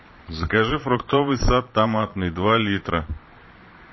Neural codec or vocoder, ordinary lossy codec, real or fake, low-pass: vocoder, 22.05 kHz, 80 mel bands, Vocos; MP3, 24 kbps; fake; 7.2 kHz